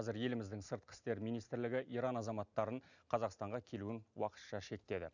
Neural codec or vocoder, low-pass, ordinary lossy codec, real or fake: none; 7.2 kHz; none; real